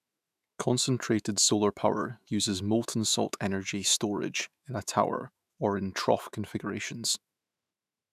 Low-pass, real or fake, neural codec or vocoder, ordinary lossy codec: 14.4 kHz; fake; autoencoder, 48 kHz, 128 numbers a frame, DAC-VAE, trained on Japanese speech; none